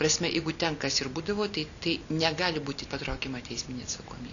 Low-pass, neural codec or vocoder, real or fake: 7.2 kHz; none; real